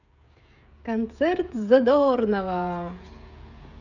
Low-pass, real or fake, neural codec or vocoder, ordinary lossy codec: 7.2 kHz; fake; codec, 16 kHz, 16 kbps, FreqCodec, smaller model; none